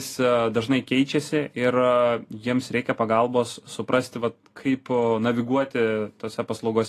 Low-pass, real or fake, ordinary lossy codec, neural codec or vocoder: 14.4 kHz; real; AAC, 48 kbps; none